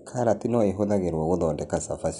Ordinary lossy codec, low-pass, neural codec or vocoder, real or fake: none; 10.8 kHz; none; real